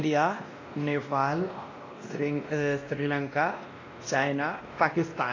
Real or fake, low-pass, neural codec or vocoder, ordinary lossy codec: fake; 7.2 kHz; codec, 16 kHz, 1 kbps, X-Codec, WavLM features, trained on Multilingual LibriSpeech; AAC, 32 kbps